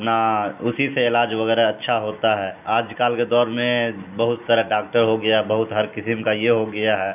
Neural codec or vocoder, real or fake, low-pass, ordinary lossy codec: none; real; 3.6 kHz; none